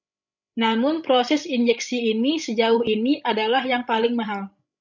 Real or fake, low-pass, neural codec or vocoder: fake; 7.2 kHz; codec, 16 kHz, 16 kbps, FreqCodec, larger model